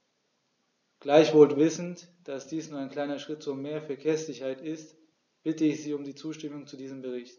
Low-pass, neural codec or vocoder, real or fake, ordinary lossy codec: 7.2 kHz; none; real; none